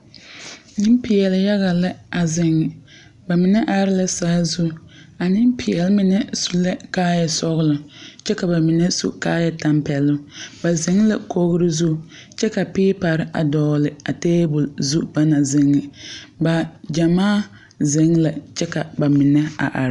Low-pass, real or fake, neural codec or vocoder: 10.8 kHz; real; none